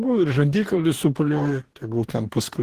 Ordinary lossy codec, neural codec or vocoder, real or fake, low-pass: Opus, 16 kbps; codec, 44.1 kHz, 2.6 kbps, DAC; fake; 14.4 kHz